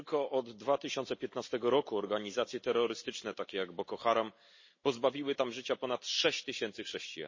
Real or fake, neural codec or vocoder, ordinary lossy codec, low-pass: real; none; MP3, 32 kbps; 7.2 kHz